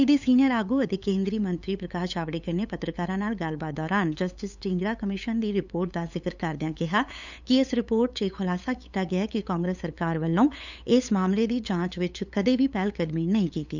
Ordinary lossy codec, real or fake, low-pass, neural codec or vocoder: none; fake; 7.2 kHz; codec, 16 kHz, 8 kbps, FunCodec, trained on LibriTTS, 25 frames a second